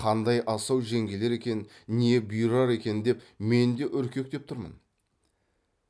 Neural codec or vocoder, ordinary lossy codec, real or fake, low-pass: none; none; real; none